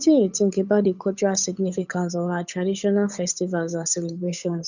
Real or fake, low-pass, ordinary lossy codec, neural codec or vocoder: fake; 7.2 kHz; none; codec, 16 kHz, 8 kbps, FunCodec, trained on Chinese and English, 25 frames a second